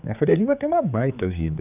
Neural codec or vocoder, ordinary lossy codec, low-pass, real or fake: codec, 16 kHz, 4 kbps, X-Codec, HuBERT features, trained on general audio; none; 3.6 kHz; fake